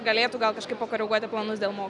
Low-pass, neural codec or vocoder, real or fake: 10.8 kHz; none; real